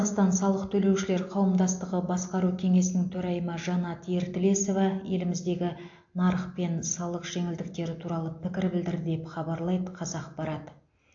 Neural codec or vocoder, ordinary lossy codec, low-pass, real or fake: none; AAC, 48 kbps; 7.2 kHz; real